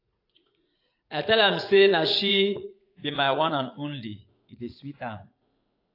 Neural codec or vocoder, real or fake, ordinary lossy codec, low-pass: vocoder, 44.1 kHz, 80 mel bands, Vocos; fake; AAC, 32 kbps; 5.4 kHz